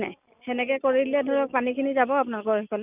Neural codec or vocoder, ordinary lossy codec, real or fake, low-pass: none; none; real; 3.6 kHz